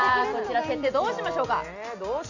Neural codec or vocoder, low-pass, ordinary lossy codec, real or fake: none; 7.2 kHz; none; real